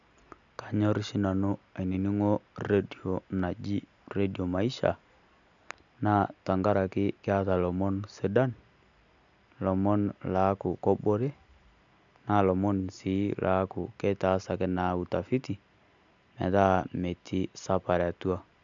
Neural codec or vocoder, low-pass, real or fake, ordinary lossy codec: none; 7.2 kHz; real; none